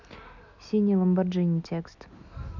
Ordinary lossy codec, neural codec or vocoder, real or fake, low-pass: none; none; real; 7.2 kHz